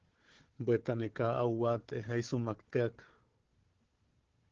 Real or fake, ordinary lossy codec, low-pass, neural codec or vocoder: fake; Opus, 16 kbps; 7.2 kHz; codec, 16 kHz, 4 kbps, FunCodec, trained on Chinese and English, 50 frames a second